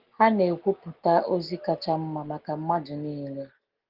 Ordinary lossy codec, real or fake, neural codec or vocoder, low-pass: Opus, 16 kbps; real; none; 5.4 kHz